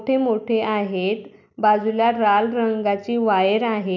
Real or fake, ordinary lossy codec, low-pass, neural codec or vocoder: real; none; 7.2 kHz; none